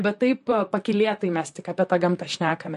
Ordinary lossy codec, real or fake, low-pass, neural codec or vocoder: MP3, 48 kbps; fake; 14.4 kHz; vocoder, 44.1 kHz, 128 mel bands, Pupu-Vocoder